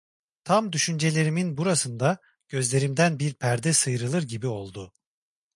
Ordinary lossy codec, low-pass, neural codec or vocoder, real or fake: MP3, 64 kbps; 10.8 kHz; none; real